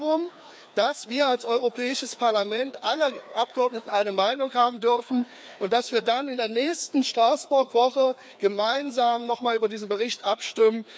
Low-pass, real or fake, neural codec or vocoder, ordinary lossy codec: none; fake; codec, 16 kHz, 2 kbps, FreqCodec, larger model; none